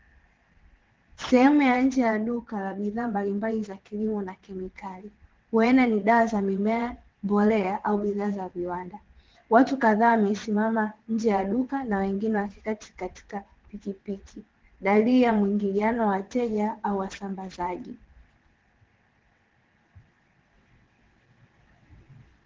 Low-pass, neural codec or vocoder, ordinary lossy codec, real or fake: 7.2 kHz; vocoder, 22.05 kHz, 80 mel bands, WaveNeXt; Opus, 16 kbps; fake